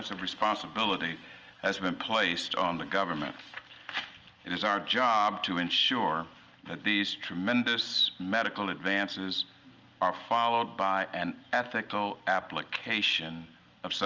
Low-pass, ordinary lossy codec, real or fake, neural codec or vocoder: 7.2 kHz; Opus, 24 kbps; real; none